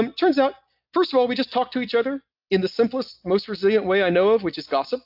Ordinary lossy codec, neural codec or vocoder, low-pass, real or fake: AAC, 48 kbps; none; 5.4 kHz; real